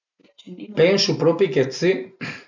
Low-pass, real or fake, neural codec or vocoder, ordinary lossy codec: 7.2 kHz; real; none; none